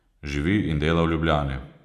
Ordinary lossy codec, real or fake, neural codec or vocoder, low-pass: none; real; none; 14.4 kHz